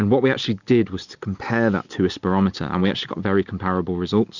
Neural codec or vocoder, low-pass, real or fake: none; 7.2 kHz; real